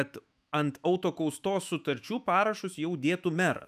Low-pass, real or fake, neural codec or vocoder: 14.4 kHz; fake; autoencoder, 48 kHz, 128 numbers a frame, DAC-VAE, trained on Japanese speech